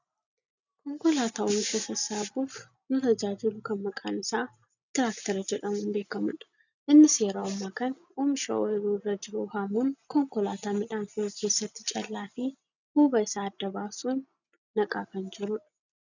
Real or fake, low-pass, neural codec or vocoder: real; 7.2 kHz; none